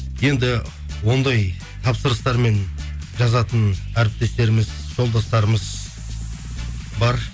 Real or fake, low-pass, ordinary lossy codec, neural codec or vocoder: real; none; none; none